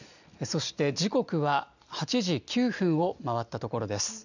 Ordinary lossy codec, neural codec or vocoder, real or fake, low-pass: none; none; real; 7.2 kHz